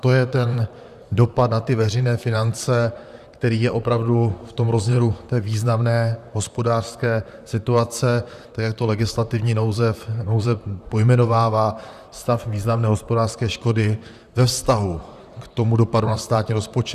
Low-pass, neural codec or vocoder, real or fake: 14.4 kHz; vocoder, 44.1 kHz, 128 mel bands, Pupu-Vocoder; fake